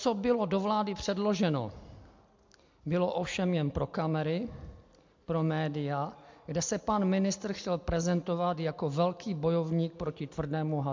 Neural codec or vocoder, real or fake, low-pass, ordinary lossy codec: none; real; 7.2 kHz; MP3, 48 kbps